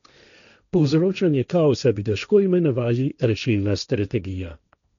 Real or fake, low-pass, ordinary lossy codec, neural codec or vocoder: fake; 7.2 kHz; none; codec, 16 kHz, 1.1 kbps, Voila-Tokenizer